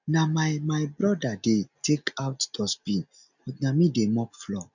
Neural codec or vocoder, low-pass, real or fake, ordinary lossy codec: none; 7.2 kHz; real; none